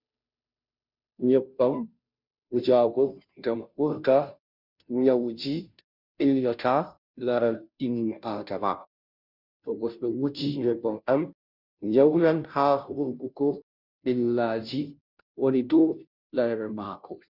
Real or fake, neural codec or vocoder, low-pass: fake; codec, 16 kHz, 0.5 kbps, FunCodec, trained on Chinese and English, 25 frames a second; 5.4 kHz